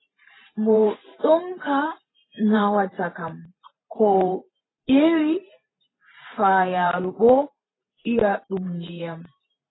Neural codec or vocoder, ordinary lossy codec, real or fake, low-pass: vocoder, 44.1 kHz, 128 mel bands every 512 samples, BigVGAN v2; AAC, 16 kbps; fake; 7.2 kHz